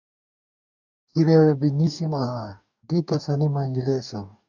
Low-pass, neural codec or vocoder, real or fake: 7.2 kHz; codec, 44.1 kHz, 2.6 kbps, DAC; fake